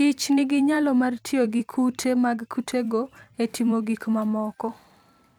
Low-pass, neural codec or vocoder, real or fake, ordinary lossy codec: 19.8 kHz; vocoder, 44.1 kHz, 128 mel bands every 256 samples, BigVGAN v2; fake; none